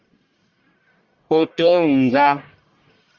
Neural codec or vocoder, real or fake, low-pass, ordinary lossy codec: codec, 44.1 kHz, 1.7 kbps, Pupu-Codec; fake; 7.2 kHz; Opus, 32 kbps